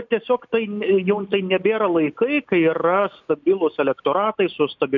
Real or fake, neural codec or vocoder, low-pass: real; none; 7.2 kHz